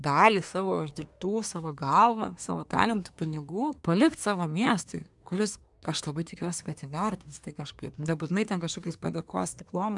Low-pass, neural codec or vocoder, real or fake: 10.8 kHz; codec, 24 kHz, 1 kbps, SNAC; fake